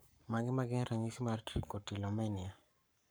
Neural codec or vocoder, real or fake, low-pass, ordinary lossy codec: codec, 44.1 kHz, 7.8 kbps, Pupu-Codec; fake; none; none